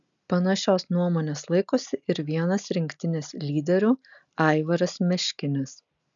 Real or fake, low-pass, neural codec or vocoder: real; 7.2 kHz; none